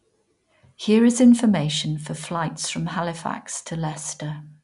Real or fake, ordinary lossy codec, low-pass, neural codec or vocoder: real; none; 10.8 kHz; none